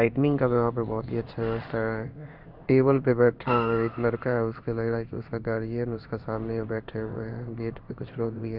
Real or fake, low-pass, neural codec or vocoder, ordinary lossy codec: fake; 5.4 kHz; codec, 16 kHz in and 24 kHz out, 1 kbps, XY-Tokenizer; none